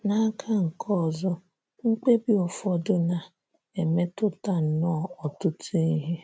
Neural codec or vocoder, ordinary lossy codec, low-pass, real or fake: none; none; none; real